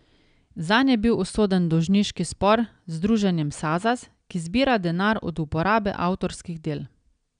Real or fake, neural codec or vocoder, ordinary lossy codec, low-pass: real; none; none; 9.9 kHz